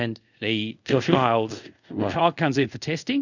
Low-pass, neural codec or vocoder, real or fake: 7.2 kHz; codec, 24 kHz, 0.5 kbps, DualCodec; fake